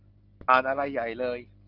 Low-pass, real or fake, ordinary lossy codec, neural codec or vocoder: 5.4 kHz; real; Opus, 24 kbps; none